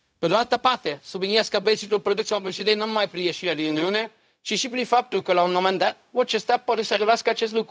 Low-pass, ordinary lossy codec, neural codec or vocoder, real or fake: none; none; codec, 16 kHz, 0.4 kbps, LongCat-Audio-Codec; fake